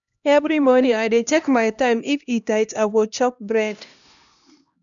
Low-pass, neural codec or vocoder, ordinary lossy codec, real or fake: 7.2 kHz; codec, 16 kHz, 1 kbps, X-Codec, HuBERT features, trained on LibriSpeech; none; fake